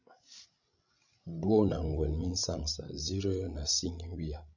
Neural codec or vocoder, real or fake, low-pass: codec, 16 kHz, 16 kbps, FreqCodec, larger model; fake; 7.2 kHz